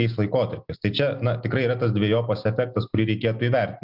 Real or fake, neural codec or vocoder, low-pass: real; none; 5.4 kHz